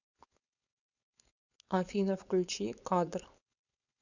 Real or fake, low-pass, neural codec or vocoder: fake; 7.2 kHz; codec, 16 kHz, 4.8 kbps, FACodec